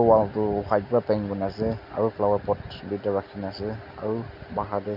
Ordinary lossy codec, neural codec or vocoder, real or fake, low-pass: none; none; real; 5.4 kHz